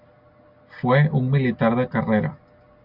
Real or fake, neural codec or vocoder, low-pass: real; none; 5.4 kHz